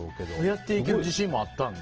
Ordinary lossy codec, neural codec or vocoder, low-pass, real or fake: Opus, 16 kbps; none; 7.2 kHz; real